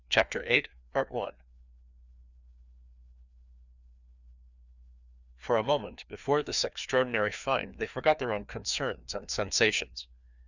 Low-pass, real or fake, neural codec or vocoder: 7.2 kHz; fake; codec, 16 kHz, 2 kbps, FreqCodec, larger model